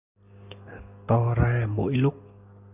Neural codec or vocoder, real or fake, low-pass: none; real; 3.6 kHz